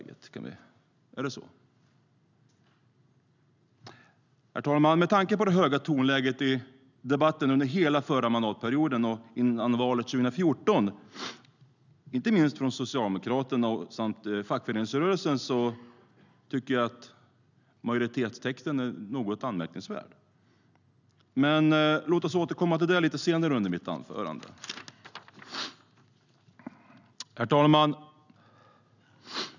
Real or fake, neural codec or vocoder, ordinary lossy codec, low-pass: real; none; none; 7.2 kHz